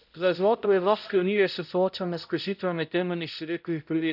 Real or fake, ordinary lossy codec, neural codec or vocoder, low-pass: fake; none; codec, 16 kHz, 0.5 kbps, X-Codec, HuBERT features, trained on balanced general audio; 5.4 kHz